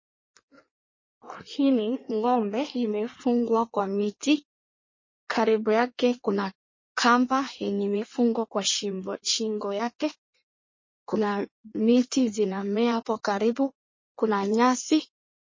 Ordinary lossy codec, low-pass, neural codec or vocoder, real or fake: MP3, 32 kbps; 7.2 kHz; codec, 16 kHz in and 24 kHz out, 1.1 kbps, FireRedTTS-2 codec; fake